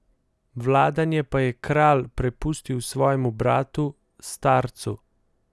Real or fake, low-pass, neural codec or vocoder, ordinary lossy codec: real; none; none; none